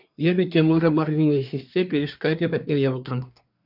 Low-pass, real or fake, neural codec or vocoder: 5.4 kHz; fake; codec, 24 kHz, 1 kbps, SNAC